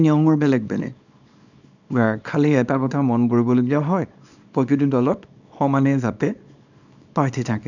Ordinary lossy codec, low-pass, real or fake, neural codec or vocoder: none; 7.2 kHz; fake; codec, 24 kHz, 0.9 kbps, WavTokenizer, small release